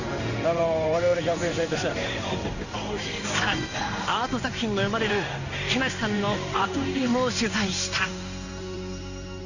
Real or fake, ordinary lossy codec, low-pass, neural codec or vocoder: fake; none; 7.2 kHz; codec, 16 kHz in and 24 kHz out, 1 kbps, XY-Tokenizer